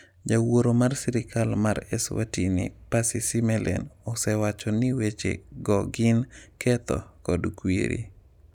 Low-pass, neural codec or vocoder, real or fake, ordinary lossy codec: 19.8 kHz; none; real; none